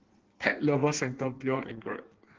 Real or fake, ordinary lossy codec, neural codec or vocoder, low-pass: fake; Opus, 16 kbps; codec, 16 kHz in and 24 kHz out, 1.1 kbps, FireRedTTS-2 codec; 7.2 kHz